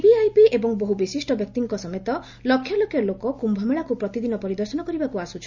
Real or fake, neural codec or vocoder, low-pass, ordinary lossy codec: real; none; 7.2 kHz; Opus, 64 kbps